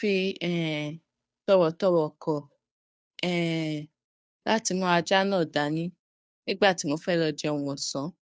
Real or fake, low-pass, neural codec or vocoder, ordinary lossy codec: fake; none; codec, 16 kHz, 2 kbps, FunCodec, trained on Chinese and English, 25 frames a second; none